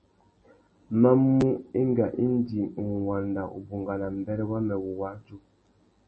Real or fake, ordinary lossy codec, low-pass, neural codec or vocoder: real; MP3, 32 kbps; 10.8 kHz; none